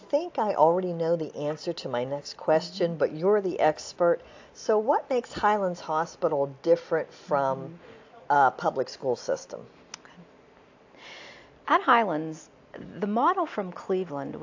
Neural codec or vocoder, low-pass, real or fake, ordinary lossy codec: none; 7.2 kHz; real; AAC, 48 kbps